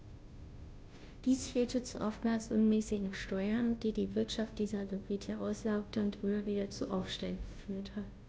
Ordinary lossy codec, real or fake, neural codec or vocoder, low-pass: none; fake; codec, 16 kHz, 0.5 kbps, FunCodec, trained on Chinese and English, 25 frames a second; none